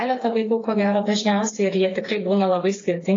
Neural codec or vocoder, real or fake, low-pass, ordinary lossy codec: codec, 16 kHz, 4 kbps, FreqCodec, smaller model; fake; 7.2 kHz; AAC, 32 kbps